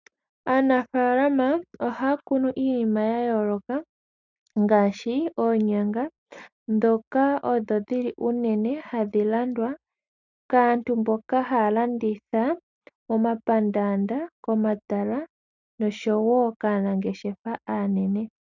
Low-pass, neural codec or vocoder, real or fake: 7.2 kHz; none; real